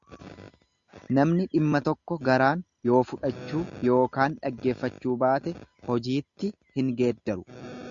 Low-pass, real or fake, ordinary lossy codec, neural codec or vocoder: 7.2 kHz; real; Opus, 64 kbps; none